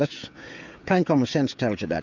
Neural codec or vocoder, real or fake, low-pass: codec, 16 kHz, 16 kbps, FreqCodec, smaller model; fake; 7.2 kHz